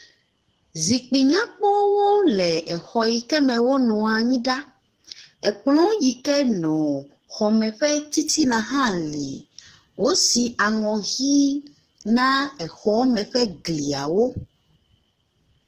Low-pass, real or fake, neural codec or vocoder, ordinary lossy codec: 14.4 kHz; fake; codec, 44.1 kHz, 2.6 kbps, SNAC; Opus, 16 kbps